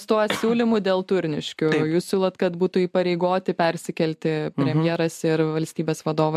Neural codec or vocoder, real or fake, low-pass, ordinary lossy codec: none; real; 14.4 kHz; MP3, 96 kbps